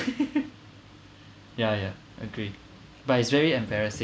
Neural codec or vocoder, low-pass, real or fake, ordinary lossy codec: none; none; real; none